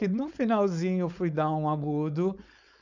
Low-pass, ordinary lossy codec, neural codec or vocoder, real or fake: 7.2 kHz; none; codec, 16 kHz, 4.8 kbps, FACodec; fake